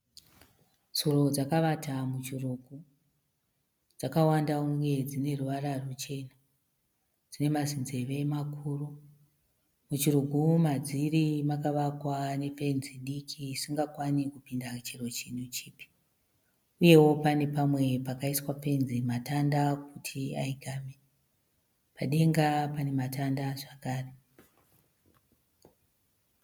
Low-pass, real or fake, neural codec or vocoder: 19.8 kHz; real; none